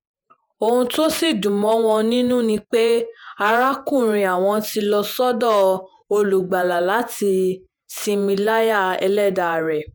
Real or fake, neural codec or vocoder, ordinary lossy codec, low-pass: fake; vocoder, 48 kHz, 128 mel bands, Vocos; none; none